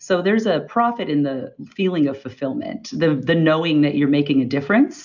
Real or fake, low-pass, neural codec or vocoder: real; 7.2 kHz; none